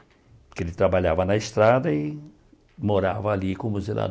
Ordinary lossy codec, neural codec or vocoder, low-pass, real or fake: none; none; none; real